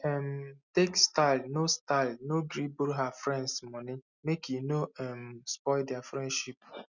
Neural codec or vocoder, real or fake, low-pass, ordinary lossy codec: none; real; 7.2 kHz; none